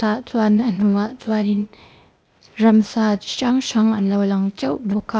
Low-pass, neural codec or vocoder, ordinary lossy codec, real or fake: none; codec, 16 kHz, 0.8 kbps, ZipCodec; none; fake